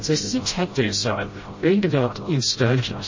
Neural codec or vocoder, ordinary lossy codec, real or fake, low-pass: codec, 16 kHz, 0.5 kbps, FreqCodec, smaller model; MP3, 32 kbps; fake; 7.2 kHz